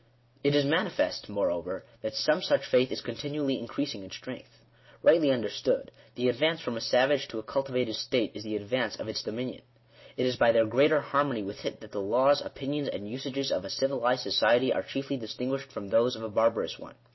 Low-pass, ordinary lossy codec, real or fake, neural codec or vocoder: 7.2 kHz; MP3, 24 kbps; real; none